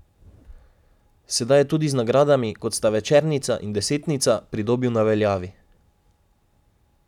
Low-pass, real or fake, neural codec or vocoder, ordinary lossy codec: 19.8 kHz; real; none; none